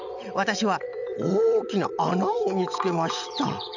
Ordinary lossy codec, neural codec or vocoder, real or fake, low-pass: none; vocoder, 22.05 kHz, 80 mel bands, WaveNeXt; fake; 7.2 kHz